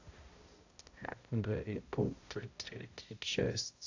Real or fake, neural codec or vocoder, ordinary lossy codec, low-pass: fake; codec, 16 kHz, 0.5 kbps, X-Codec, HuBERT features, trained on balanced general audio; AAC, 48 kbps; 7.2 kHz